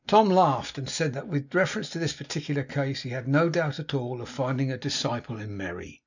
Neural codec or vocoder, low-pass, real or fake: none; 7.2 kHz; real